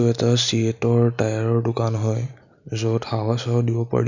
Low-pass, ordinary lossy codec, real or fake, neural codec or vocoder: 7.2 kHz; none; real; none